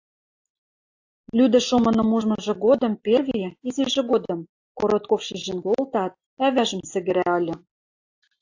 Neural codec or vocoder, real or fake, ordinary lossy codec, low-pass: none; real; AAC, 48 kbps; 7.2 kHz